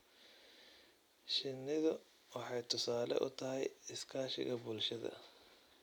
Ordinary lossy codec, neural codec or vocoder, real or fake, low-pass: none; none; real; 19.8 kHz